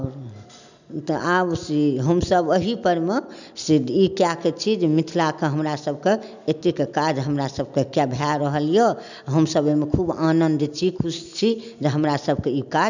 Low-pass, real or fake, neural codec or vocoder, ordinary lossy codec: 7.2 kHz; real; none; none